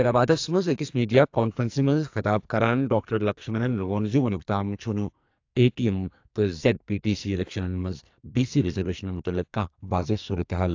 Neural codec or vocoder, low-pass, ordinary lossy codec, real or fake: codec, 44.1 kHz, 2.6 kbps, SNAC; 7.2 kHz; AAC, 48 kbps; fake